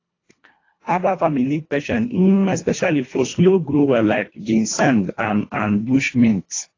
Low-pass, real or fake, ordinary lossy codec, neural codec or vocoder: 7.2 kHz; fake; AAC, 32 kbps; codec, 24 kHz, 1.5 kbps, HILCodec